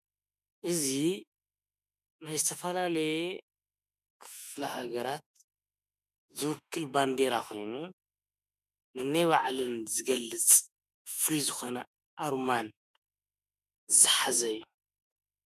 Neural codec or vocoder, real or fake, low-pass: autoencoder, 48 kHz, 32 numbers a frame, DAC-VAE, trained on Japanese speech; fake; 14.4 kHz